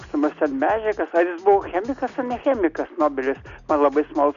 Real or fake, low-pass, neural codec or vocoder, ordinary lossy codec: real; 7.2 kHz; none; AAC, 96 kbps